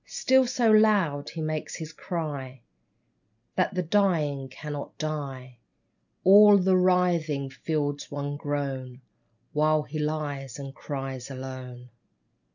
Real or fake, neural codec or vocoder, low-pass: real; none; 7.2 kHz